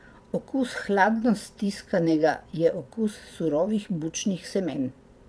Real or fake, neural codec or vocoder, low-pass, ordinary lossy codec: fake; vocoder, 22.05 kHz, 80 mel bands, Vocos; none; none